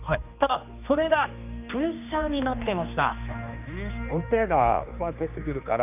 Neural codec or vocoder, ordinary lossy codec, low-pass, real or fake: codec, 16 kHz, 2 kbps, X-Codec, HuBERT features, trained on general audio; none; 3.6 kHz; fake